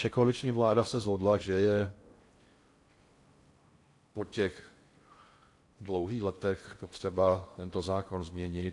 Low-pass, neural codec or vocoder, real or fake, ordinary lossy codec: 10.8 kHz; codec, 16 kHz in and 24 kHz out, 0.6 kbps, FocalCodec, streaming, 4096 codes; fake; AAC, 48 kbps